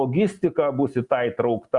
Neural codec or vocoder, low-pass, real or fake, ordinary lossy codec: none; 10.8 kHz; real; Opus, 64 kbps